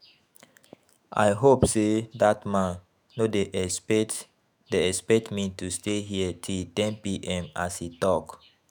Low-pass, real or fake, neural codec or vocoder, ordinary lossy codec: none; fake; autoencoder, 48 kHz, 128 numbers a frame, DAC-VAE, trained on Japanese speech; none